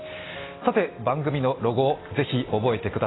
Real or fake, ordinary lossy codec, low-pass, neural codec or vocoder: real; AAC, 16 kbps; 7.2 kHz; none